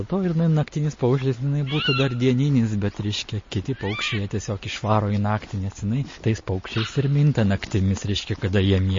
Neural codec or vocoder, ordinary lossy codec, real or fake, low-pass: none; MP3, 32 kbps; real; 7.2 kHz